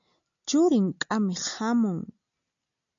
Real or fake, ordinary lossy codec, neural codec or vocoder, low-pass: real; AAC, 64 kbps; none; 7.2 kHz